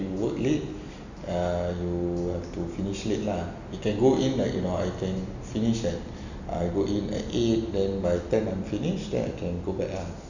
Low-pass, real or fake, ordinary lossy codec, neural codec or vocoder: 7.2 kHz; real; none; none